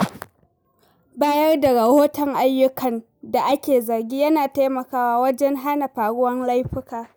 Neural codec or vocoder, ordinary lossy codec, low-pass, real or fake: none; none; none; real